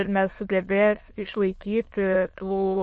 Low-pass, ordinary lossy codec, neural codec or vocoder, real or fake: 9.9 kHz; MP3, 32 kbps; autoencoder, 22.05 kHz, a latent of 192 numbers a frame, VITS, trained on many speakers; fake